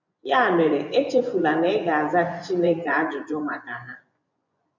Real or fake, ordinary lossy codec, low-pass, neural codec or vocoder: fake; none; 7.2 kHz; vocoder, 24 kHz, 100 mel bands, Vocos